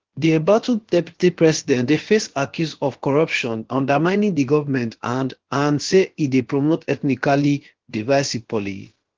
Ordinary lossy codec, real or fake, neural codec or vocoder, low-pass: Opus, 16 kbps; fake; codec, 16 kHz, about 1 kbps, DyCAST, with the encoder's durations; 7.2 kHz